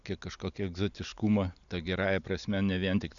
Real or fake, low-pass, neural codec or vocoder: real; 7.2 kHz; none